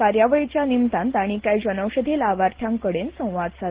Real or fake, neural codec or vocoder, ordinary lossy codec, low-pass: real; none; Opus, 16 kbps; 3.6 kHz